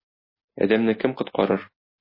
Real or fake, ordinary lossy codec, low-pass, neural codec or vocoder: real; MP3, 24 kbps; 5.4 kHz; none